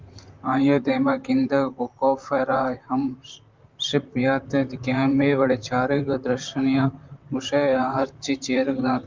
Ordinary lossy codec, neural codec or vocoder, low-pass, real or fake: Opus, 24 kbps; vocoder, 44.1 kHz, 128 mel bands, Pupu-Vocoder; 7.2 kHz; fake